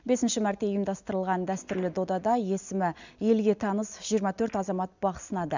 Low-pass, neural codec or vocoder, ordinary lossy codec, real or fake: 7.2 kHz; none; none; real